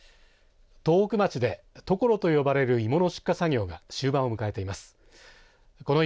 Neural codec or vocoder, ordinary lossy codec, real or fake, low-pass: none; none; real; none